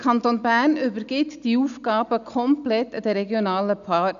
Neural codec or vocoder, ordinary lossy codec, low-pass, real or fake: none; none; 7.2 kHz; real